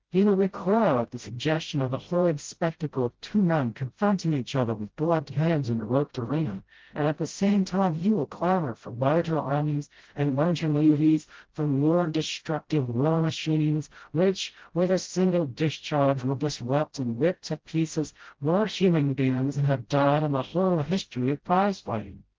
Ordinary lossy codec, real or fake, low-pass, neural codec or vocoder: Opus, 16 kbps; fake; 7.2 kHz; codec, 16 kHz, 0.5 kbps, FreqCodec, smaller model